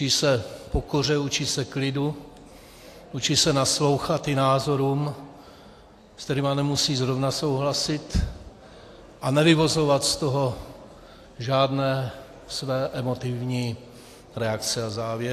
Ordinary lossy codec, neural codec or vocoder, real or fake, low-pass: AAC, 64 kbps; none; real; 14.4 kHz